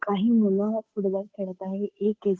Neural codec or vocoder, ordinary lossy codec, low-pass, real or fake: codec, 16 kHz, 8 kbps, FunCodec, trained on Chinese and English, 25 frames a second; none; none; fake